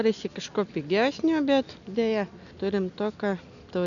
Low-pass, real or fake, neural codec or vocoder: 7.2 kHz; real; none